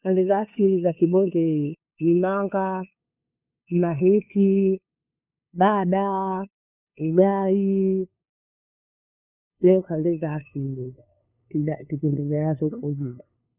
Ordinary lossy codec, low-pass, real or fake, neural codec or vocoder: none; 3.6 kHz; fake; codec, 16 kHz, 2 kbps, FunCodec, trained on LibriTTS, 25 frames a second